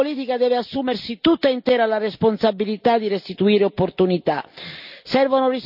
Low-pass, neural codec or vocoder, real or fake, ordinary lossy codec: 5.4 kHz; none; real; none